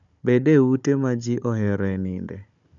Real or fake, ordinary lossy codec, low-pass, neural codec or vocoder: fake; none; 7.2 kHz; codec, 16 kHz, 16 kbps, FunCodec, trained on Chinese and English, 50 frames a second